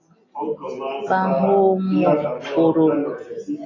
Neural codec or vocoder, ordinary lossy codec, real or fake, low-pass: none; AAC, 32 kbps; real; 7.2 kHz